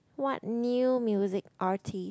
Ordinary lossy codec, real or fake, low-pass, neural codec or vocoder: none; real; none; none